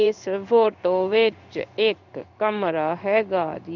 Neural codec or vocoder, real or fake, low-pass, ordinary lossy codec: codec, 16 kHz in and 24 kHz out, 1 kbps, XY-Tokenizer; fake; 7.2 kHz; none